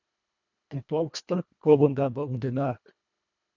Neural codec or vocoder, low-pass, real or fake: codec, 24 kHz, 1.5 kbps, HILCodec; 7.2 kHz; fake